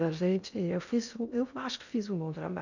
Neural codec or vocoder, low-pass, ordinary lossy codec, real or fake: codec, 16 kHz in and 24 kHz out, 0.6 kbps, FocalCodec, streaming, 2048 codes; 7.2 kHz; none; fake